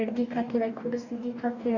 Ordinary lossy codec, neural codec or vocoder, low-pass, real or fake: none; codec, 44.1 kHz, 2.6 kbps, DAC; 7.2 kHz; fake